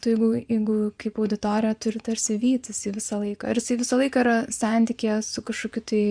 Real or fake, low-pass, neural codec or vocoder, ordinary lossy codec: fake; 9.9 kHz; vocoder, 24 kHz, 100 mel bands, Vocos; Opus, 64 kbps